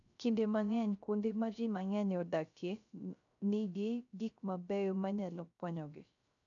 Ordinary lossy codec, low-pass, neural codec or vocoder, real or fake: none; 7.2 kHz; codec, 16 kHz, 0.3 kbps, FocalCodec; fake